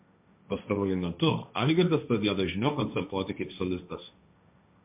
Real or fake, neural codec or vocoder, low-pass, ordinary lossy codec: fake; codec, 16 kHz, 1.1 kbps, Voila-Tokenizer; 3.6 kHz; MP3, 32 kbps